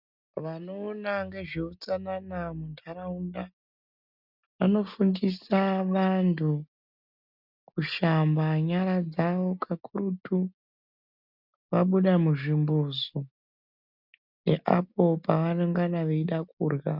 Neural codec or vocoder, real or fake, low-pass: none; real; 5.4 kHz